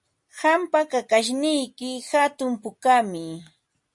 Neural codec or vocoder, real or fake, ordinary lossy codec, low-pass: none; real; MP3, 48 kbps; 10.8 kHz